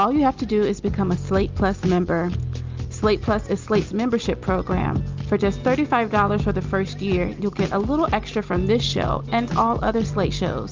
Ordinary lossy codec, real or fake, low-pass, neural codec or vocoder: Opus, 24 kbps; real; 7.2 kHz; none